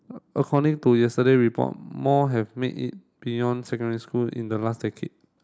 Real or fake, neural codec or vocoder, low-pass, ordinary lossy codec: real; none; none; none